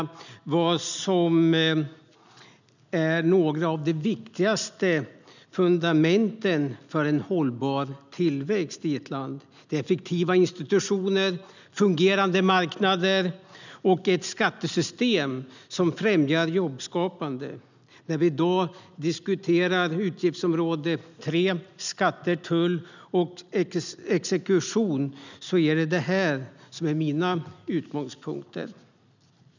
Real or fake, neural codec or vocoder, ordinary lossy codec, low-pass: real; none; none; 7.2 kHz